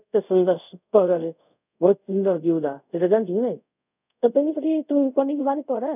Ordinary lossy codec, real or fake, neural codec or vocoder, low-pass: none; fake; codec, 24 kHz, 0.5 kbps, DualCodec; 3.6 kHz